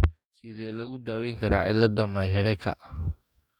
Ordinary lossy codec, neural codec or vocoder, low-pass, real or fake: none; codec, 44.1 kHz, 2.6 kbps, DAC; 19.8 kHz; fake